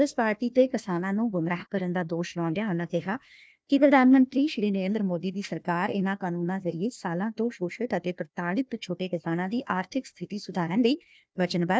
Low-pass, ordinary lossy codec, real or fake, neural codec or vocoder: none; none; fake; codec, 16 kHz, 1 kbps, FunCodec, trained on Chinese and English, 50 frames a second